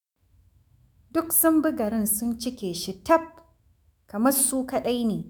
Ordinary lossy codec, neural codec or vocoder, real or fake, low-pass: none; autoencoder, 48 kHz, 128 numbers a frame, DAC-VAE, trained on Japanese speech; fake; none